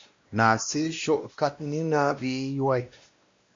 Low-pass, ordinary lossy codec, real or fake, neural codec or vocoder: 7.2 kHz; MP3, 48 kbps; fake; codec, 16 kHz, 1 kbps, X-Codec, WavLM features, trained on Multilingual LibriSpeech